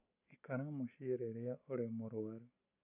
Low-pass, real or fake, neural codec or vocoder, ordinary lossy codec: 3.6 kHz; real; none; none